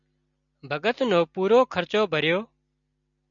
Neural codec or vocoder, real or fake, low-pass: none; real; 7.2 kHz